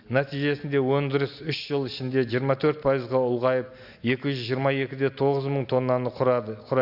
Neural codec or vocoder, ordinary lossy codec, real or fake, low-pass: none; AAC, 48 kbps; real; 5.4 kHz